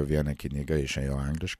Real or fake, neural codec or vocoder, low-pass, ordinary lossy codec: real; none; 14.4 kHz; MP3, 96 kbps